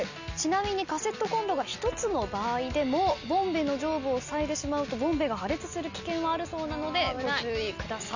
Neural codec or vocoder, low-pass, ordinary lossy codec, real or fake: none; 7.2 kHz; none; real